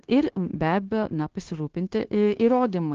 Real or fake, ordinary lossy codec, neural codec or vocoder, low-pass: fake; Opus, 16 kbps; codec, 16 kHz, 0.9 kbps, LongCat-Audio-Codec; 7.2 kHz